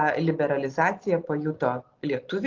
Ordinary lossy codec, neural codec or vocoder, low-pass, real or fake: Opus, 24 kbps; none; 7.2 kHz; real